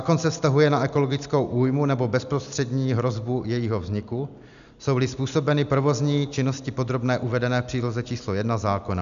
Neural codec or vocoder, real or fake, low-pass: none; real; 7.2 kHz